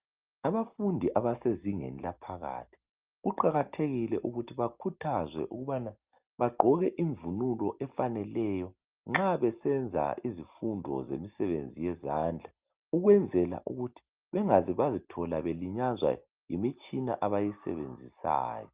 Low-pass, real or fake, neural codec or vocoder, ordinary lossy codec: 3.6 kHz; real; none; Opus, 24 kbps